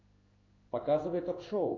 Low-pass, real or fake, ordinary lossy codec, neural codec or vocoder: 7.2 kHz; fake; AAC, 32 kbps; codec, 16 kHz in and 24 kHz out, 1 kbps, XY-Tokenizer